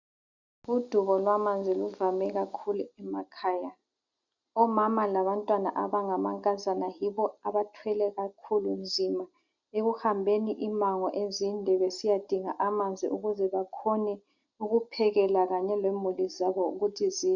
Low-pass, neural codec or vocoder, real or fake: 7.2 kHz; none; real